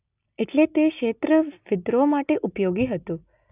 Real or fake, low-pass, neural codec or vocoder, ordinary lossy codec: real; 3.6 kHz; none; none